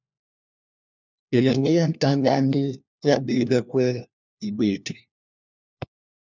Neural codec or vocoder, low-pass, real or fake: codec, 16 kHz, 1 kbps, FunCodec, trained on LibriTTS, 50 frames a second; 7.2 kHz; fake